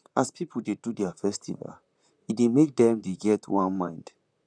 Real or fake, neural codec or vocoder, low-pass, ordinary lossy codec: fake; vocoder, 44.1 kHz, 128 mel bands, Pupu-Vocoder; 9.9 kHz; none